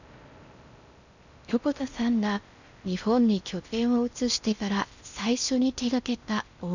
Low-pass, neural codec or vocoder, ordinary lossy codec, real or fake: 7.2 kHz; codec, 16 kHz in and 24 kHz out, 0.6 kbps, FocalCodec, streaming, 4096 codes; none; fake